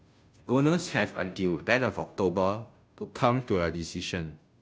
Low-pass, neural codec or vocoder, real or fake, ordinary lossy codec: none; codec, 16 kHz, 0.5 kbps, FunCodec, trained on Chinese and English, 25 frames a second; fake; none